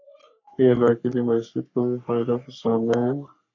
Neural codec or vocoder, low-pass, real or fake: codec, 44.1 kHz, 3.4 kbps, Pupu-Codec; 7.2 kHz; fake